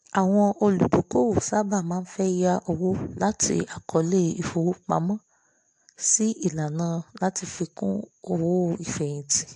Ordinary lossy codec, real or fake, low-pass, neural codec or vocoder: AAC, 64 kbps; real; 10.8 kHz; none